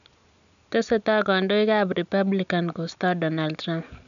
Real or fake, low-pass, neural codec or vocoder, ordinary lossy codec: real; 7.2 kHz; none; none